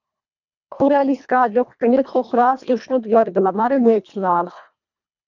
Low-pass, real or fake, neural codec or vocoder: 7.2 kHz; fake; codec, 24 kHz, 1.5 kbps, HILCodec